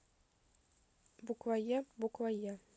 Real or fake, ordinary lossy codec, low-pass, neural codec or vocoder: real; none; none; none